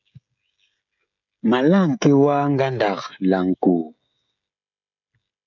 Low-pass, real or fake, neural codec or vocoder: 7.2 kHz; fake; codec, 16 kHz, 16 kbps, FreqCodec, smaller model